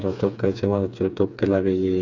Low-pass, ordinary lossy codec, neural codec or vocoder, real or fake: 7.2 kHz; none; codec, 16 kHz, 4 kbps, FreqCodec, smaller model; fake